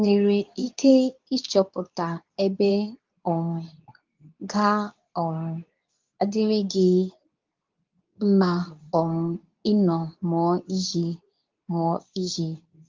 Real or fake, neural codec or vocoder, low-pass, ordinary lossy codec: fake; codec, 24 kHz, 0.9 kbps, WavTokenizer, medium speech release version 2; 7.2 kHz; Opus, 32 kbps